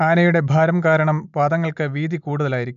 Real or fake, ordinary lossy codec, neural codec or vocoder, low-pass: real; none; none; 7.2 kHz